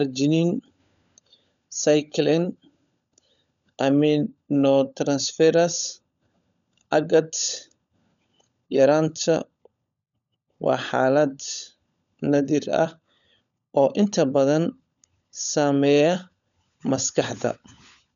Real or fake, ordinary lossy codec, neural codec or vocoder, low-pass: fake; MP3, 96 kbps; codec, 16 kHz, 16 kbps, FunCodec, trained on LibriTTS, 50 frames a second; 7.2 kHz